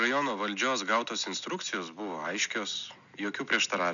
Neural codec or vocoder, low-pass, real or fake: none; 7.2 kHz; real